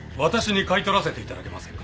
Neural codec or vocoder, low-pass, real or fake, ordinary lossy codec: none; none; real; none